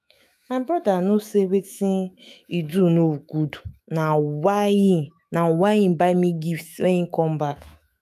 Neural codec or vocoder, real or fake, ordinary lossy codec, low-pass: autoencoder, 48 kHz, 128 numbers a frame, DAC-VAE, trained on Japanese speech; fake; none; 14.4 kHz